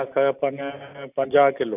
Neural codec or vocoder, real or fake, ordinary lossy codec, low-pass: none; real; none; 3.6 kHz